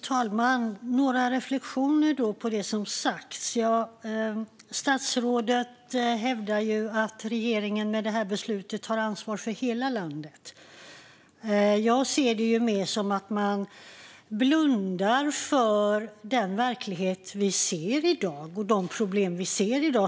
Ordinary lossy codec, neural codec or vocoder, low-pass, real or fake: none; none; none; real